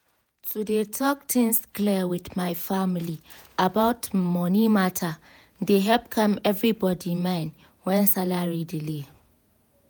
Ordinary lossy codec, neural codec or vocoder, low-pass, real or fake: none; vocoder, 48 kHz, 128 mel bands, Vocos; none; fake